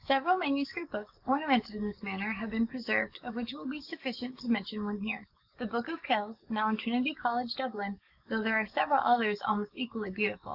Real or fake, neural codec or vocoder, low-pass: fake; codec, 44.1 kHz, 7.8 kbps, DAC; 5.4 kHz